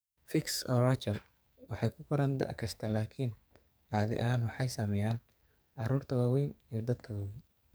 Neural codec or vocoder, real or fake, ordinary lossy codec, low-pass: codec, 44.1 kHz, 2.6 kbps, SNAC; fake; none; none